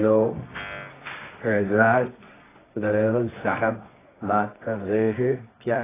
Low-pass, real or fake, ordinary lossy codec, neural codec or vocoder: 3.6 kHz; fake; AAC, 16 kbps; codec, 24 kHz, 0.9 kbps, WavTokenizer, medium music audio release